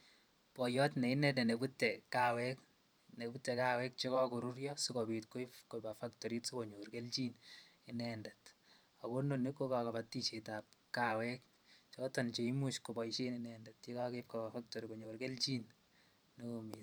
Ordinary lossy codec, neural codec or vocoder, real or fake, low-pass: none; vocoder, 44.1 kHz, 128 mel bands every 512 samples, BigVGAN v2; fake; none